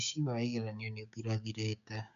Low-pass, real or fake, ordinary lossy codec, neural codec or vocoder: 7.2 kHz; fake; none; codec, 16 kHz, 6 kbps, DAC